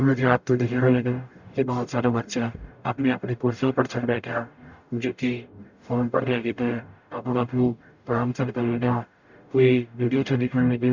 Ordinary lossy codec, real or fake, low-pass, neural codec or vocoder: none; fake; 7.2 kHz; codec, 44.1 kHz, 0.9 kbps, DAC